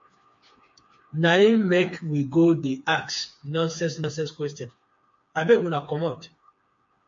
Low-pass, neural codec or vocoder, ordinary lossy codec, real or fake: 7.2 kHz; codec, 16 kHz, 4 kbps, FreqCodec, smaller model; MP3, 48 kbps; fake